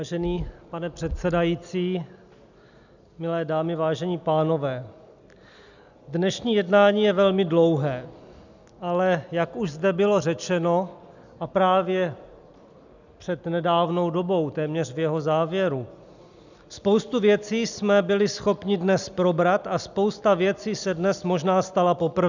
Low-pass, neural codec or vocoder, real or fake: 7.2 kHz; none; real